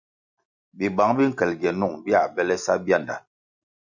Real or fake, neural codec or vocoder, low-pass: real; none; 7.2 kHz